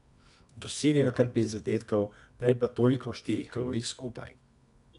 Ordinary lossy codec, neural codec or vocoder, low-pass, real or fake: none; codec, 24 kHz, 0.9 kbps, WavTokenizer, medium music audio release; 10.8 kHz; fake